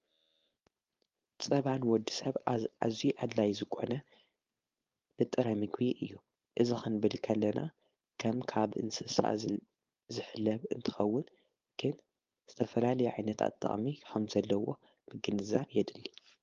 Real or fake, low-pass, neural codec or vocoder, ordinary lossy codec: fake; 7.2 kHz; codec, 16 kHz, 4.8 kbps, FACodec; Opus, 32 kbps